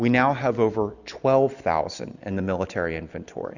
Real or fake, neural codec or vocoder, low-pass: real; none; 7.2 kHz